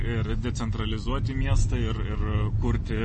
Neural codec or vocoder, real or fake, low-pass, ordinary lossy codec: none; real; 10.8 kHz; MP3, 32 kbps